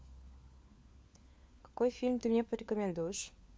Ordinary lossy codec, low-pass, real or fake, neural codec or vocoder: none; none; fake; codec, 16 kHz, 4 kbps, FunCodec, trained on LibriTTS, 50 frames a second